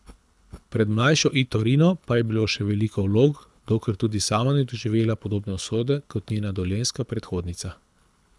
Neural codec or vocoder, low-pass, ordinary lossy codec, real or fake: codec, 24 kHz, 6 kbps, HILCodec; none; none; fake